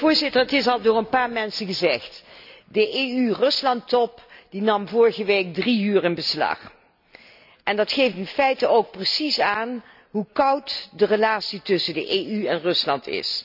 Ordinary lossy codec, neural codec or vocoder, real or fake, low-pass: none; none; real; 5.4 kHz